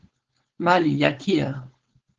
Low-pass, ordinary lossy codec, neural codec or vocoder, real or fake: 7.2 kHz; Opus, 16 kbps; codec, 16 kHz, 4.8 kbps, FACodec; fake